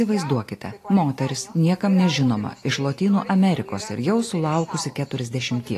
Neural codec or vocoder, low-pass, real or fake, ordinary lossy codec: none; 14.4 kHz; real; AAC, 48 kbps